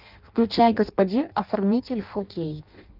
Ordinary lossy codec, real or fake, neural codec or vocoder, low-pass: Opus, 24 kbps; fake; codec, 16 kHz in and 24 kHz out, 0.6 kbps, FireRedTTS-2 codec; 5.4 kHz